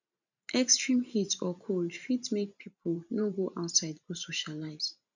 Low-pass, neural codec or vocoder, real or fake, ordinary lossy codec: 7.2 kHz; vocoder, 44.1 kHz, 128 mel bands every 512 samples, BigVGAN v2; fake; none